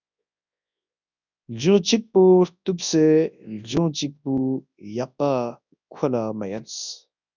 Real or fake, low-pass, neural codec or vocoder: fake; 7.2 kHz; codec, 24 kHz, 0.9 kbps, WavTokenizer, large speech release